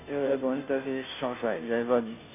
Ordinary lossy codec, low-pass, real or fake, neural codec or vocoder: none; 3.6 kHz; fake; codec, 16 kHz, 0.5 kbps, FunCodec, trained on Chinese and English, 25 frames a second